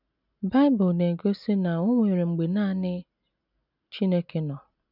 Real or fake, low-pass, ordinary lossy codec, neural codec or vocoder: real; 5.4 kHz; none; none